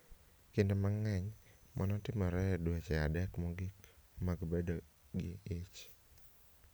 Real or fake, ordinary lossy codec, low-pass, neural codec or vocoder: real; none; none; none